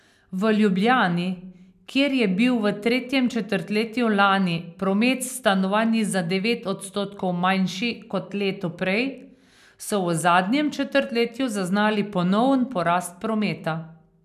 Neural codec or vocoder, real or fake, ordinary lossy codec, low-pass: none; real; none; 14.4 kHz